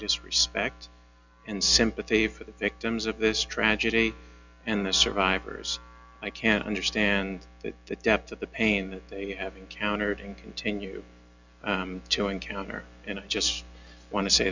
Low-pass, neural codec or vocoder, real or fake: 7.2 kHz; none; real